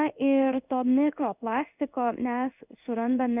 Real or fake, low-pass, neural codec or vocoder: fake; 3.6 kHz; codec, 16 kHz in and 24 kHz out, 1 kbps, XY-Tokenizer